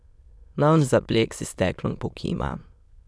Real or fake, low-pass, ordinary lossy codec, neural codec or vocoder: fake; none; none; autoencoder, 22.05 kHz, a latent of 192 numbers a frame, VITS, trained on many speakers